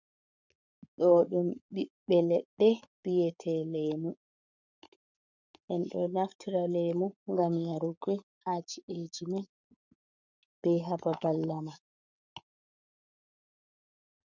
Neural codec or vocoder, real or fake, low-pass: codec, 44.1 kHz, 7.8 kbps, Pupu-Codec; fake; 7.2 kHz